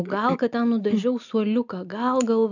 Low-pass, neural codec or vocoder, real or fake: 7.2 kHz; none; real